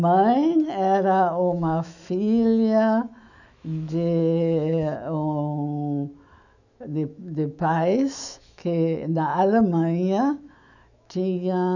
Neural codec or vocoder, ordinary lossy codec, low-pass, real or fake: autoencoder, 48 kHz, 128 numbers a frame, DAC-VAE, trained on Japanese speech; none; 7.2 kHz; fake